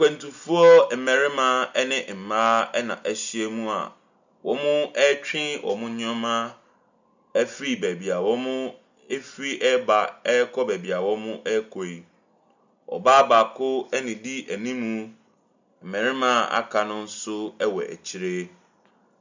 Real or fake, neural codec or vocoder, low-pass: real; none; 7.2 kHz